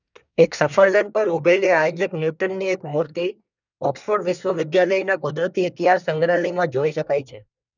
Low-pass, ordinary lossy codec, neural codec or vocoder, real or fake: 7.2 kHz; none; codec, 24 kHz, 1 kbps, SNAC; fake